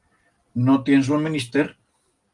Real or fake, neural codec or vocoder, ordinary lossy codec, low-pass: real; none; Opus, 32 kbps; 10.8 kHz